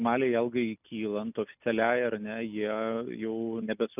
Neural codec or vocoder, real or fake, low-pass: none; real; 3.6 kHz